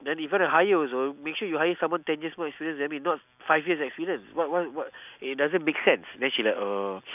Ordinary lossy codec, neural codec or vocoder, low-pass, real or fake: none; none; 3.6 kHz; real